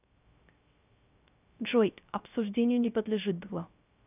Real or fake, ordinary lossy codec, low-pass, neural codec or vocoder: fake; none; 3.6 kHz; codec, 16 kHz, 0.3 kbps, FocalCodec